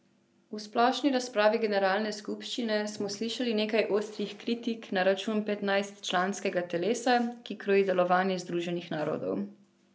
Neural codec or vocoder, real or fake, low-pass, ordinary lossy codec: none; real; none; none